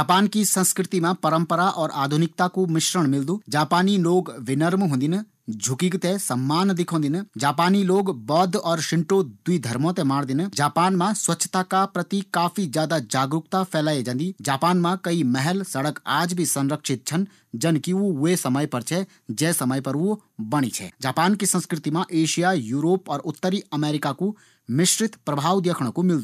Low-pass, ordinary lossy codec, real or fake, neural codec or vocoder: 14.4 kHz; none; real; none